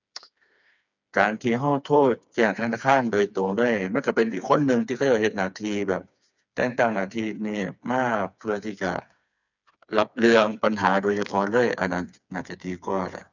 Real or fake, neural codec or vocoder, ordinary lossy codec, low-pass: fake; codec, 16 kHz, 4 kbps, FreqCodec, smaller model; none; 7.2 kHz